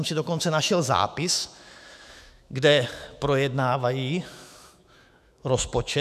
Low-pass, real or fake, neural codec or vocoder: 14.4 kHz; fake; autoencoder, 48 kHz, 128 numbers a frame, DAC-VAE, trained on Japanese speech